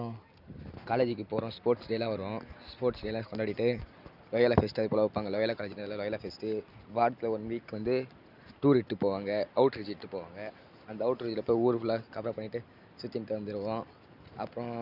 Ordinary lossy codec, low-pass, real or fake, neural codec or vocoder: none; 5.4 kHz; real; none